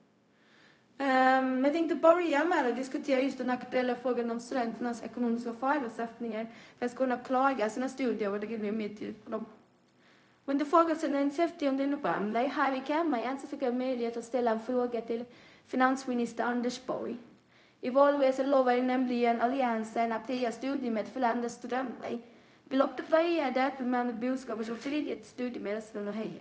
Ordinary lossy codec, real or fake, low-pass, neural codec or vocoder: none; fake; none; codec, 16 kHz, 0.4 kbps, LongCat-Audio-Codec